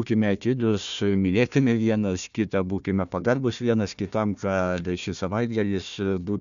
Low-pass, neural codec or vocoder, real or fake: 7.2 kHz; codec, 16 kHz, 1 kbps, FunCodec, trained on Chinese and English, 50 frames a second; fake